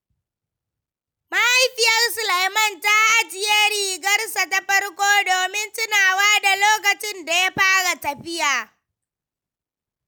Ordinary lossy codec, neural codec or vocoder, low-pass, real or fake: none; none; none; real